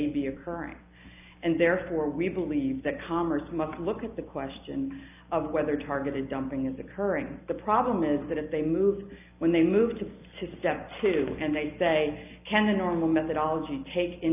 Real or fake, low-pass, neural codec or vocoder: real; 3.6 kHz; none